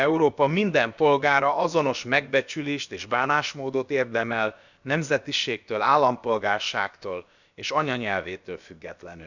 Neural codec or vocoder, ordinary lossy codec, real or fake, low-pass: codec, 16 kHz, about 1 kbps, DyCAST, with the encoder's durations; none; fake; 7.2 kHz